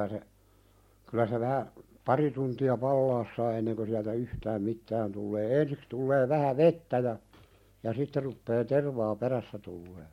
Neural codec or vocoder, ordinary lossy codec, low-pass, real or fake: none; MP3, 64 kbps; 19.8 kHz; real